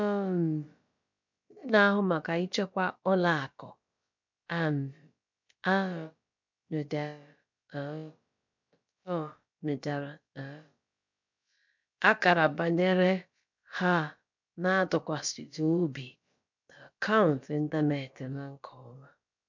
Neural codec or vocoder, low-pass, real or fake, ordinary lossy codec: codec, 16 kHz, about 1 kbps, DyCAST, with the encoder's durations; 7.2 kHz; fake; MP3, 64 kbps